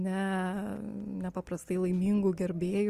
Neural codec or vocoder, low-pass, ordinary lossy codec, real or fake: none; 14.4 kHz; Opus, 24 kbps; real